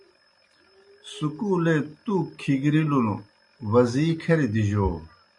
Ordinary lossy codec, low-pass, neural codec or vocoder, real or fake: MP3, 48 kbps; 10.8 kHz; none; real